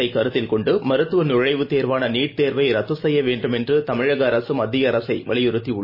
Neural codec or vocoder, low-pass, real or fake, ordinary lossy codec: none; 5.4 kHz; real; MP3, 24 kbps